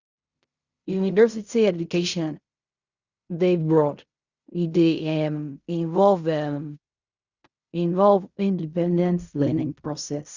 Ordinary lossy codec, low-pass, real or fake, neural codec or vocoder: Opus, 64 kbps; 7.2 kHz; fake; codec, 16 kHz in and 24 kHz out, 0.4 kbps, LongCat-Audio-Codec, fine tuned four codebook decoder